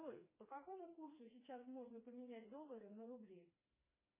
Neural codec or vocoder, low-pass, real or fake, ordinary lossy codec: codec, 16 kHz, 2 kbps, FreqCodec, smaller model; 3.6 kHz; fake; MP3, 16 kbps